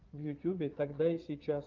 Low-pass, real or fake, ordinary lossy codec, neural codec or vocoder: 7.2 kHz; fake; Opus, 24 kbps; vocoder, 22.05 kHz, 80 mel bands, Vocos